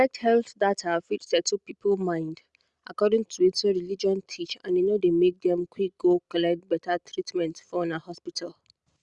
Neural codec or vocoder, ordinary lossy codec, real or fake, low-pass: none; Opus, 64 kbps; real; 10.8 kHz